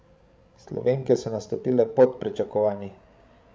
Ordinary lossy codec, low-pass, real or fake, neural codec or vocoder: none; none; fake; codec, 16 kHz, 16 kbps, FreqCodec, larger model